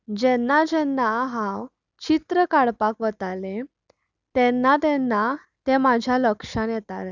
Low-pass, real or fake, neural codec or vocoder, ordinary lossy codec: 7.2 kHz; real; none; none